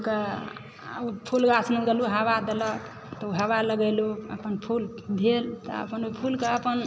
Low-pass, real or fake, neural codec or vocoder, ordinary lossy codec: none; real; none; none